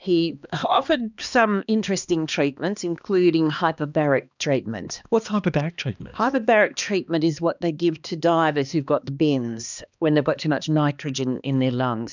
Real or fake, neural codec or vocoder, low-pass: fake; codec, 16 kHz, 2 kbps, X-Codec, HuBERT features, trained on balanced general audio; 7.2 kHz